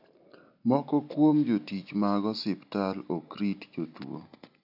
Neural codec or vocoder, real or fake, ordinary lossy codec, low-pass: none; real; none; 5.4 kHz